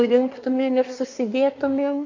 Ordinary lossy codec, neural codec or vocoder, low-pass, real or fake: MP3, 64 kbps; codec, 24 kHz, 1 kbps, SNAC; 7.2 kHz; fake